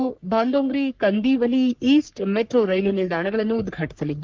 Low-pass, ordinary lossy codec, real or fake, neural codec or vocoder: 7.2 kHz; Opus, 24 kbps; fake; codec, 44.1 kHz, 3.4 kbps, Pupu-Codec